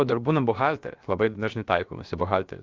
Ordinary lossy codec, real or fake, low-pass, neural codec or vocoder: Opus, 32 kbps; fake; 7.2 kHz; codec, 16 kHz, about 1 kbps, DyCAST, with the encoder's durations